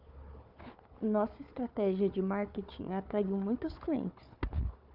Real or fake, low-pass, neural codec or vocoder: fake; 5.4 kHz; codec, 16 kHz, 4 kbps, FunCodec, trained on Chinese and English, 50 frames a second